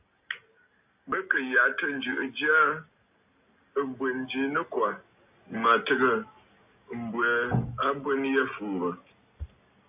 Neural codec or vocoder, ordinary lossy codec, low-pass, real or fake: none; AAC, 32 kbps; 3.6 kHz; real